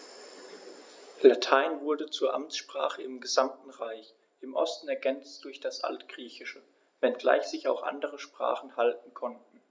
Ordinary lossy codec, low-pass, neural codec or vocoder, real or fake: none; none; none; real